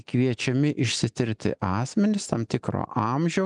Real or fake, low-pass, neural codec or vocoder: real; 10.8 kHz; none